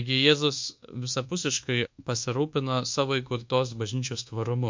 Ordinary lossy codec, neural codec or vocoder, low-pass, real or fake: MP3, 48 kbps; codec, 24 kHz, 1.2 kbps, DualCodec; 7.2 kHz; fake